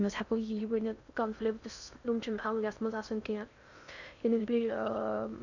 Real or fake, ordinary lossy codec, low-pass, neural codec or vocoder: fake; none; 7.2 kHz; codec, 16 kHz in and 24 kHz out, 0.6 kbps, FocalCodec, streaming, 4096 codes